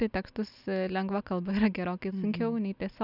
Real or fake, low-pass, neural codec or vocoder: real; 5.4 kHz; none